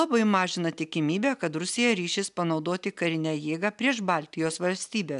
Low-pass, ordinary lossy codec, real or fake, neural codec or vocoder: 10.8 kHz; AAC, 96 kbps; real; none